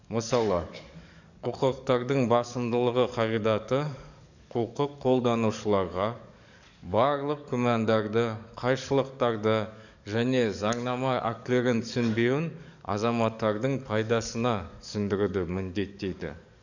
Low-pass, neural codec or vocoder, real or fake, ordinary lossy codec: 7.2 kHz; codec, 44.1 kHz, 7.8 kbps, DAC; fake; none